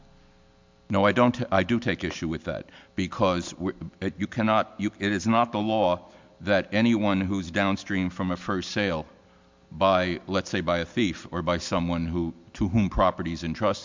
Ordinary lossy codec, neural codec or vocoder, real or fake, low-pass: MP3, 64 kbps; none; real; 7.2 kHz